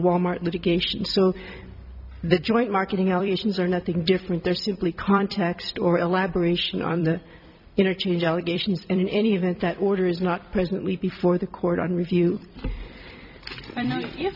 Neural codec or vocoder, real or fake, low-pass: vocoder, 44.1 kHz, 128 mel bands every 256 samples, BigVGAN v2; fake; 5.4 kHz